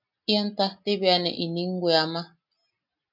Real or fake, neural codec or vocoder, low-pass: real; none; 5.4 kHz